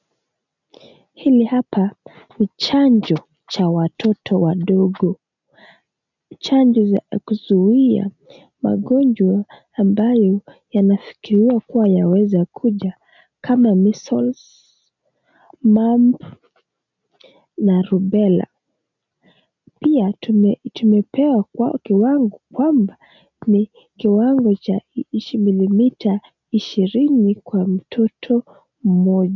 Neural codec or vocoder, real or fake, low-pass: none; real; 7.2 kHz